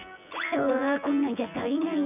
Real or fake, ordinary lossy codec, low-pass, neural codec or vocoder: fake; none; 3.6 kHz; vocoder, 24 kHz, 100 mel bands, Vocos